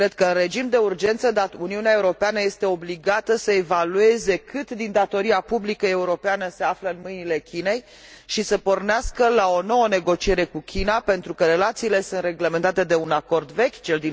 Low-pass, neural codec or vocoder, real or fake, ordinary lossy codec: none; none; real; none